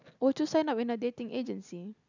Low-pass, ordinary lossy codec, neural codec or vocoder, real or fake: 7.2 kHz; none; none; real